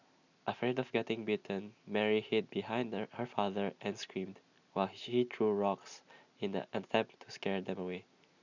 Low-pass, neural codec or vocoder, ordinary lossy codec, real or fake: 7.2 kHz; none; none; real